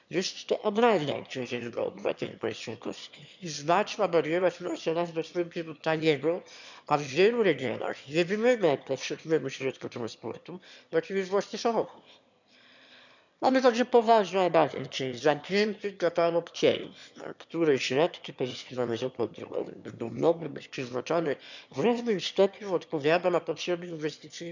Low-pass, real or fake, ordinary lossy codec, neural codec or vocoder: 7.2 kHz; fake; none; autoencoder, 22.05 kHz, a latent of 192 numbers a frame, VITS, trained on one speaker